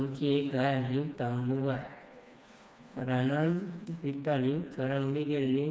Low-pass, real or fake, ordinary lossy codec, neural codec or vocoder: none; fake; none; codec, 16 kHz, 2 kbps, FreqCodec, smaller model